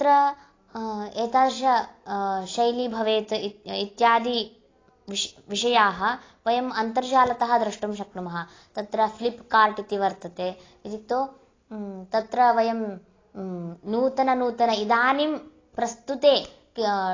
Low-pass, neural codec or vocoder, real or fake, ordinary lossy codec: 7.2 kHz; none; real; AAC, 32 kbps